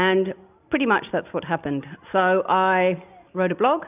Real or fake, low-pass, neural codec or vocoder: real; 3.6 kHz; none